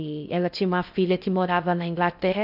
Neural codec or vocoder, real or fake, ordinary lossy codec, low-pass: codec, 16 kHz in and 24 kHz out, 0.6 kbps, FocalCodec, streaming, 4096 codes; fake; none; 5.4 kHz